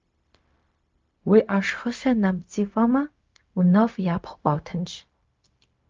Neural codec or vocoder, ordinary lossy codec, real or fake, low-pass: codec, 16 kHz, 0.4 kbps, LongCat-Audio-Codec; Opus, 24 kbps; fake; 7.2 kHz